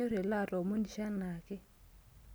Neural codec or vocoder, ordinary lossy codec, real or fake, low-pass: none; none; real; none